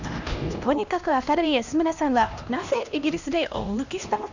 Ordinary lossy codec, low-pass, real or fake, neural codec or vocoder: none; 7.2 kHz; fake; codec, 16 kHz, 1 kbps, X-Codec, HuBERT features, trained on LibriSpeech